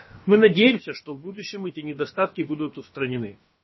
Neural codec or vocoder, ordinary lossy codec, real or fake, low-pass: codec, 16 kHz, about 1 kbps, DyCAST, with the encoder's durations; MP3, 24 kbps; fake; 7.2 kHz